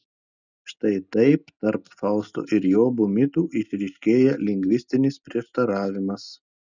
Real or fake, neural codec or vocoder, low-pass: real; none; 7.2 kHz